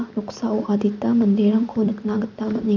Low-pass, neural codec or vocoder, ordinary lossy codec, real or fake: 7.2 kHz; vocoder, 44.1 kHz, 128 mel bands every 256 samples, BigVGAN v2; none; fake